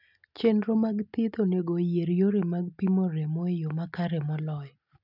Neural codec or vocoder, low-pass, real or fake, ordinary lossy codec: none; 5.4 kHz; real; none